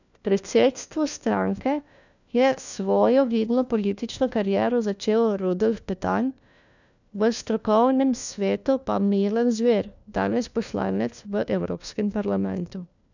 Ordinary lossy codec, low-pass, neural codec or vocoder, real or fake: none; 7.2 kHz; codec, 16 kHz, 1 kbps, FunCodec, trained on LibriTTS, 50 frames a second; fake